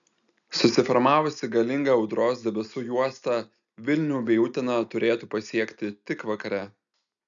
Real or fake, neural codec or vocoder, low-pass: real; none; 7.2 kHz